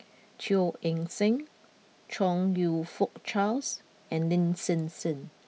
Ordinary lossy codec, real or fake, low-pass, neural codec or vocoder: none; real; none; none